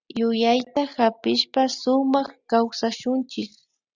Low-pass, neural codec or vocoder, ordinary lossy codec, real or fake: 7.2 kHz; none; Opus, 64 kbps; real